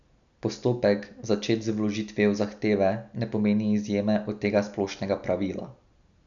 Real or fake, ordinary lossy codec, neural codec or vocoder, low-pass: real; none; none; 7.2 kHz